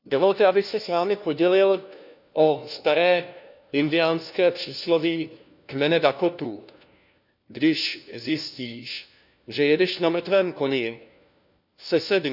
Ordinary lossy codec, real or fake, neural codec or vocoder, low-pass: none; fake; codec, 16 kHz, 1 kbps, FunCodec, trained on LibriTTS, 50 frames a second; 5.4 kHz